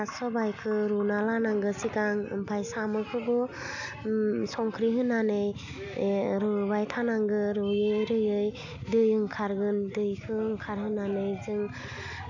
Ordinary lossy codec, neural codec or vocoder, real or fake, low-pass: none; none; real; 7.2 kHz